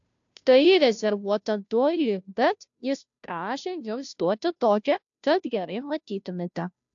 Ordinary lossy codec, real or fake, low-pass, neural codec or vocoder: AAC, 64 kbps; fake; 7.2 kHz; codec, 16 kHz, 0.5 kbps, FunCodec, trained on Chinese and English, 25 frames a second